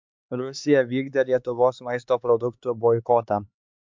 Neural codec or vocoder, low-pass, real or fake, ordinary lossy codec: codec, 16 kHz, 4 kbps, X-Codec, HuBERT features, trained on LibriSpeech; 7.2 kHz; fake; MP3, 64 kbps